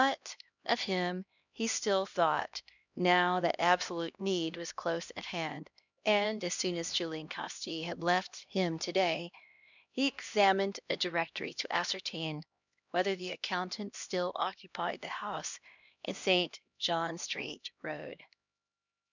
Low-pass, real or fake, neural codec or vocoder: 7.2 kHz; fake; codec, 16 kHz, 1 kbps, X-Codec, HuBERT features, trained on LibriSpeech